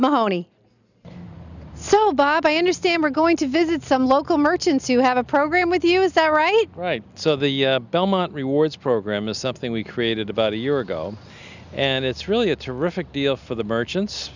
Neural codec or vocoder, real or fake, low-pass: none; real; 7.2 kHz